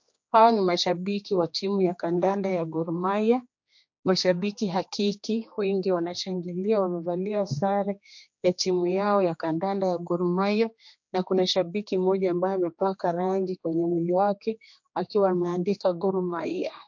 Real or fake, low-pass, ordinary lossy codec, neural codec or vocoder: fake; 7.2 kHz; MP3, 48 kbps; codec, 16 kHz, 2 kbps, X-Codec, HuBERT features, trained on general audio